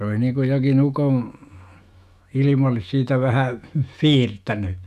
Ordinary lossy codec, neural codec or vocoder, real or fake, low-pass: none; autoencoder, 48 kHz, 128 numbers a frame, DAC-VAE, trained on Japanese speech; fake; 14.4 kHz